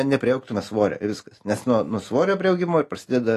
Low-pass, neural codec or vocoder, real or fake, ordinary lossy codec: 14.4 kHz; none; real; AAC, 48 kbps